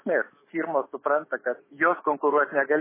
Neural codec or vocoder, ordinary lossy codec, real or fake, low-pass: autoencoder, 48 kHz, 128 numbers a frame, DAC-VAE, trained on Japanese speech; MP3, 16 kbps; fake; 3.6 kHz